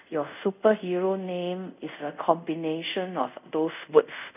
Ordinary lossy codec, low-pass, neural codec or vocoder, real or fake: none; 3.6 kHz; codec, 24 kHz, 0.5 kbps, DualCodec; fake